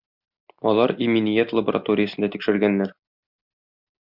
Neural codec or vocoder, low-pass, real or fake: none; 5.4 kHz; real